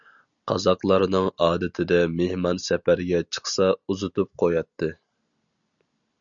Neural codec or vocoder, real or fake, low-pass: none; real; 7.2 kHz